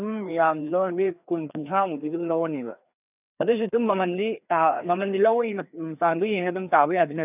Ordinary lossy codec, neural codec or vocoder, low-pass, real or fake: none; codec, 16 kHz, 2 kbps, FreqCodec, larger model; 3.6 kHz; fake